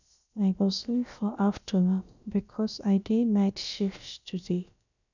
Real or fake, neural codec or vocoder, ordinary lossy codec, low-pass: fake; codec, 16 kHz, about 1 kbps, DyCAST, with the encoder's durations; none; 7.2 kHz